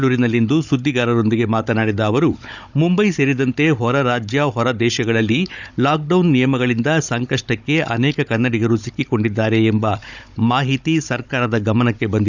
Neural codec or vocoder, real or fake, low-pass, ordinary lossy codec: codec, 16 kHz, 16 kbps, FunCodec, trained on Chinese and English, 50 frames a second; fake; 7.2 kHz; none